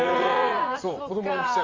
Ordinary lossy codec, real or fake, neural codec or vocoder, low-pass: Opus, 32 kbps; fake; vocoder, 44.1 kHz, 80 mel bands, Vocos; 7.2 kHz